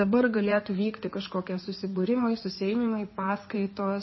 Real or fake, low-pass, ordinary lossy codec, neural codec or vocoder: fake; 7.2 kHz; MP3, 24 kbps; codec, 16 kHz in and 24 kHz out, 2.2 kbps, FireRedTTS-2 codec